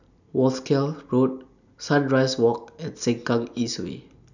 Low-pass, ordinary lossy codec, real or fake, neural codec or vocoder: 7.2 kHz; none; real; none